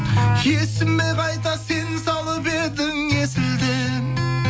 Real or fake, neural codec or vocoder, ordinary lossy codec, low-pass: real; none; none; none